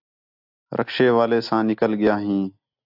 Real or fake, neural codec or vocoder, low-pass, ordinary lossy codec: real; none; 5.4 kHz; AAC, 48 kbps